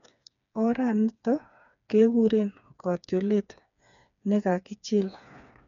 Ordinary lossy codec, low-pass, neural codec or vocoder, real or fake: none; 7.2 kHz; codec, 16 kHz, 4 kbps, FreqCodec, smaller model; fake